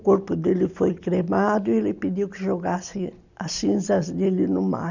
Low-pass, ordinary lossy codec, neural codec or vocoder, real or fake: 7.2 kHz; none; none; real